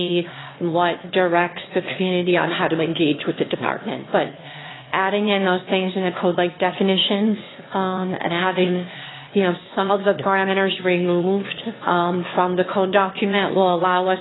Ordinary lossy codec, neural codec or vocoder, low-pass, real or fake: AAC, 16 kbps; autoencoder, 22.05 kHz, a latent of 192 numbers a frame, VITS, trained on one speaker; 7.2 kHz; fake